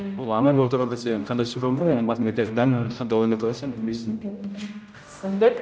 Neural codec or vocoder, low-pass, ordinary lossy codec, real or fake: codec, 16 kHz, 0.5 kbps, X-Codec, HuBERT features, trained on general audio; none; none; fake